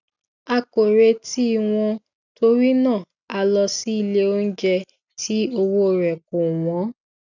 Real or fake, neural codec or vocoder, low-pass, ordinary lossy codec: real; none; 7.2 kHz; none